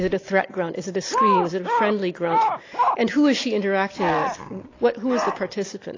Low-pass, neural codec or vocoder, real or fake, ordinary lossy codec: 7.2 kHz; none; real; AAC, 32 kbps